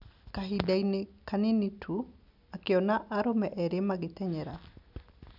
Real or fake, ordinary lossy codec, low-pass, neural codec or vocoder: real; none; 5.4 kHz; none